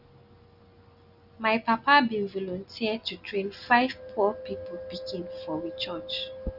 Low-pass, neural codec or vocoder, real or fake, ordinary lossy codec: 5.4 kHz; none; real; none